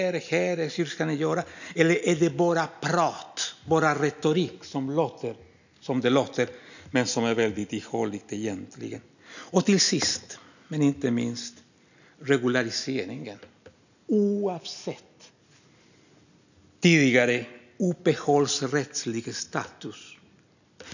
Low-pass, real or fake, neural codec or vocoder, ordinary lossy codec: 7.2 kHz; real; none; none